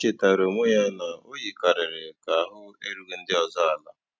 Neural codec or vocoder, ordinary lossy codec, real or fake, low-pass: none; none; real; none